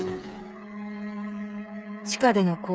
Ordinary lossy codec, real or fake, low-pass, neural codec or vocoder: none; fake; none; codec, 16 kHz, 8 kbps, FreqCodec, smaller model